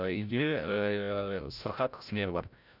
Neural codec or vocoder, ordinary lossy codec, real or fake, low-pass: codec, 16 kHz, 0.5 kbps, FreqCodec, larger model; none; fake; 5.4 kHz